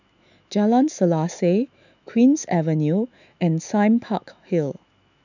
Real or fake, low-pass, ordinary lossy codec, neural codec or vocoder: fake; 7.2 kHz; none; autoencoder, 48 kHz, 128 numbers a frame, DAC-VAE, trained on Japanese speech